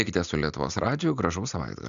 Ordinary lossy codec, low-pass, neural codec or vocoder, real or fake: AAC, 96 kbps; 7.2 kHz; none; real